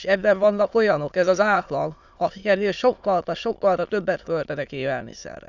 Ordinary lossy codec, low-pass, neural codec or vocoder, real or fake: none; 7.2 kHz; autoencoder, 22.05 kHz, a latent of 192 numbers a frame, VITS, trained on many speakers; fake